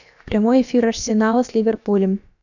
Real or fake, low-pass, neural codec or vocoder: fake; 7.2 kHz; codec, 16 kHz, about 1 kbps, DyCAST, with the encoder's durations